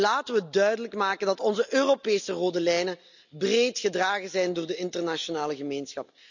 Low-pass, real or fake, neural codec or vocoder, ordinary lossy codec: 7.2 kHz; real; none; none